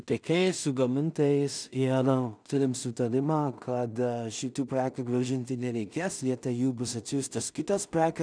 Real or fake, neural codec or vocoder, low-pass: fake; codec, 16 kHz in and 24 kHz out, 0.4 kbps, LongCat-Audio-Codec, two codebook decoder; 9.9 kHz